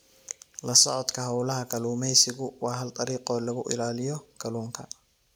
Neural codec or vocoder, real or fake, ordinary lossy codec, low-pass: none; real; none; none